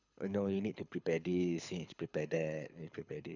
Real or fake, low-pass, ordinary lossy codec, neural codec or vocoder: fake; 7.2 kHz; none; codec, 24 kHz, 6 kbps, HILCodec